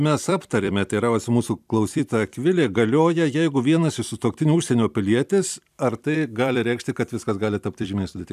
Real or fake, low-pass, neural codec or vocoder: fake; 14.4 kHz; vocoder, 44.1 kHz, 128 mel bands every 256 samples, BigVGAN v2